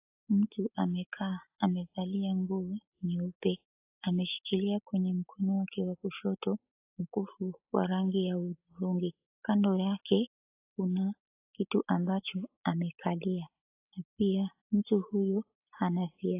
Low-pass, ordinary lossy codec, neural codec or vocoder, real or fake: 3.6 kHz; AAC, 32 kbps; none; real